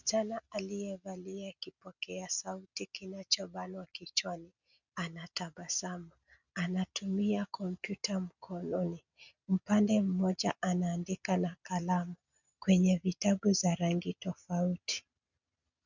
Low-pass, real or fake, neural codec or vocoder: 7.2 kHz; real; none